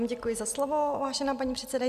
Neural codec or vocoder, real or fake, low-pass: none; real; 14.4 kHz